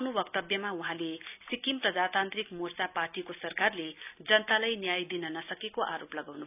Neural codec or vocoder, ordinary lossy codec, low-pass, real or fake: none; none; 3.6 kHz; real